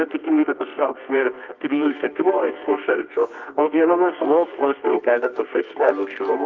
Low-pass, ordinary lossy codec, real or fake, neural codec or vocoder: 7.2 kHz; Opus, 32 kbps; fake; codec, 24 kHz, 0.9 kbps, WavTokenizer, medium music audio release